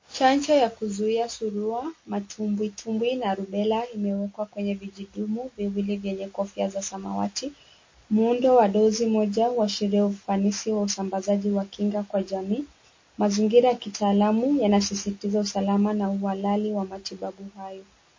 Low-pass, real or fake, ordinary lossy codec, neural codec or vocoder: 7.2 kHz; real; MP3, 32 kbps; none